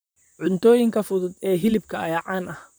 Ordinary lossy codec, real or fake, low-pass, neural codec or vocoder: none; fake; none; vocoder, 44.1 kHz, 128 mel bands, Pupu-Vocoder